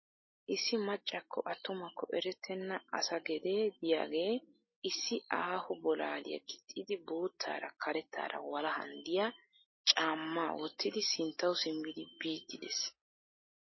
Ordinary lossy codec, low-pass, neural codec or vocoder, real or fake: MP3, 24 kbps; 7.2 kHz; none; real